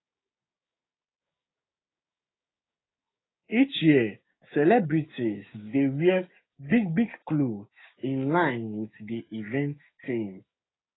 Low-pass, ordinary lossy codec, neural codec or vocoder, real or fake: 7.2 kHz; AAC, 16 kbps; codec, 16 kHz, 6 kbps, DAC; fake